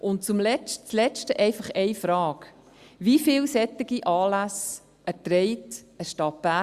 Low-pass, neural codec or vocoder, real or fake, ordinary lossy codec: 14.4 kHz; none; real; Opus, 64 kbps